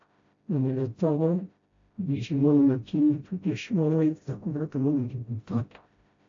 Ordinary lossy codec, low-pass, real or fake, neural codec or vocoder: AAC, 48 kbps; 7.2 kHz; fake; codec, 16 kHz, 0.5 kbps, FreqCodec, smaller model